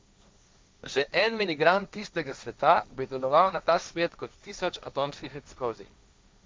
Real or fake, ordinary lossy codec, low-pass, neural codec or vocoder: fake; none; none; codec, 16 kHz, 1.1 kbps, Voila-Tokenizer